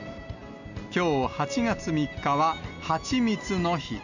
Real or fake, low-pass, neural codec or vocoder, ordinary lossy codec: real; 7.2 kHz; none; none